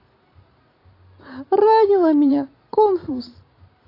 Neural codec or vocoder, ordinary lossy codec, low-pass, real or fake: vocoder, 44.1 kHz, 128 mel bands every 512 samples, BigVGAN v2; AAC, 32 kbps; 5.4 kHz; fake